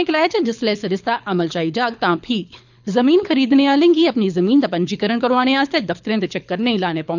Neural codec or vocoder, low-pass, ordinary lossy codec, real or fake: codec, 24 kHz, 6 kbps, HILCodec; 7.2 kHz; none; fake